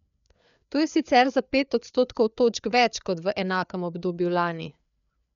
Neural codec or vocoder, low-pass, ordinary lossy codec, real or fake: codec, 16 kHz, 4 kbps, FreqCodec, larger model; 7.2 kHz; none; fake